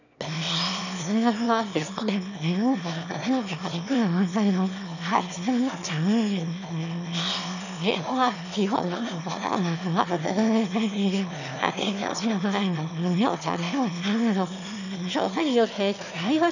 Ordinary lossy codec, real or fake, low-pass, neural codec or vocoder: none; fake; 7.2 kHz; autoencoder, 22.05 kHz, a latent of 192 numbers a frame, VITS, trained on one speaker